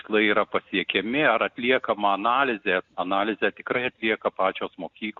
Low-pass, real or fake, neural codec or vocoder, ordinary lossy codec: 7.2 kHz; real; none; Opus, 64 kbps